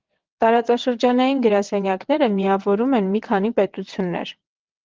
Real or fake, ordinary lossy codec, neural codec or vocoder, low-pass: fake; Opus, 16 kbps; vocoder, 22.05 kHz, 80 mel bands, WaveNeXt; 7.2 kHz